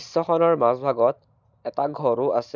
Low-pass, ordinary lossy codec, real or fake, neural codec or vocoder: 7.2 kHz; none; real; none